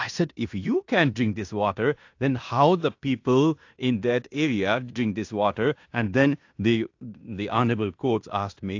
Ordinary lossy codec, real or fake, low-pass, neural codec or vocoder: AAC, 48 kbps; fake; 7.2 kHz; codec, 16 kHz in and 24 kHz out, 0.9 kbps, LongCat-Audio-Codec, fine tuned four codebook decoder